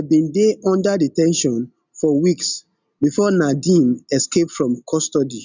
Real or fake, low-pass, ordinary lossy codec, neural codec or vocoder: real; 7.2 kHz; none; none